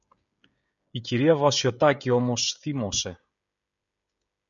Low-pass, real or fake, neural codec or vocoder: 7.2 kHz; fake; codec, 16 kHz, 16 kbps, FreqCodec, smaller model